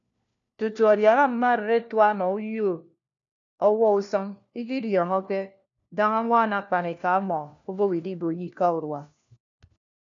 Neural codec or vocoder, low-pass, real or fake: codec, 16 kHz, 1 kbps, FunCodec, trained on LibriTTS, 50 frames a second; 7.2 kHz; fake